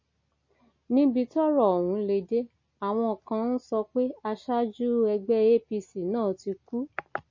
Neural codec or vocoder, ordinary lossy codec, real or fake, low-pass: none; MP3, 32 kbps; real; 7.2 kHz